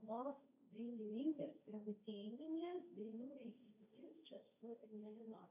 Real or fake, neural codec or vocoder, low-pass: fake; codec, 16 kHz, 1.1 kbps, Voila-Tokenizer; 3.6 kHz